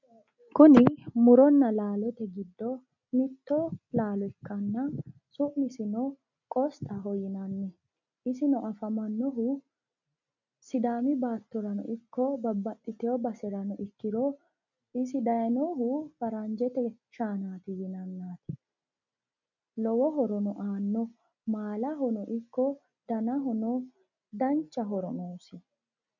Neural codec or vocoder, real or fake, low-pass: none; real; 7.2 kHz